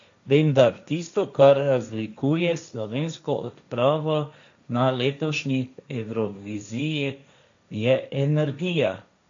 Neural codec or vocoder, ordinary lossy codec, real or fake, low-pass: codec, 16 kHz, 1.1 kbps, Voila-Tokenizer; MP3, 64 kbps; fake; 7.2 kHz